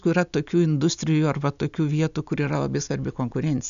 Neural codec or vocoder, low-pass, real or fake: none; 7.2 kHz; real